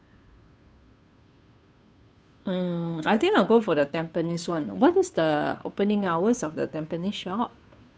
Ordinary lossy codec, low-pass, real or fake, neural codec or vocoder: none; none; fake; codec, 16 kHz, 2 kbps, FunCodec, trained on Chinese and English, 25 frames a second